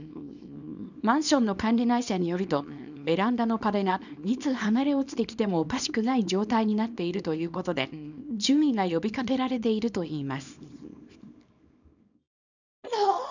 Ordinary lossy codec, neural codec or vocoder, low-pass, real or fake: none; codec, 24 kHz, 0.9 kbps, WavTokenizer, small release; 7.2 kHz; fake